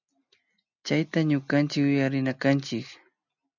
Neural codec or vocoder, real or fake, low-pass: none; real; 7.2 kHz